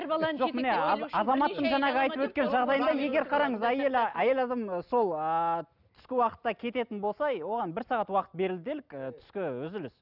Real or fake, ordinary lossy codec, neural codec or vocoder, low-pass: real; none; none; 5.4 kHz